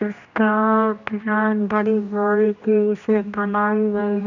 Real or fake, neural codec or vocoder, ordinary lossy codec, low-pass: fake; codec, 16 kHz, 1 kbps, X-Codec, HuBERT features, trained on general audio; none; 7.2 kHz